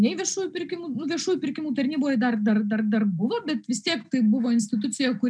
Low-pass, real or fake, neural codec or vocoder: 9.9 kHz; real; none